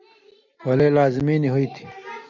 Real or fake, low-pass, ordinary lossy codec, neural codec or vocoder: real; 7.2 kHz; MP3, 64 kbps; none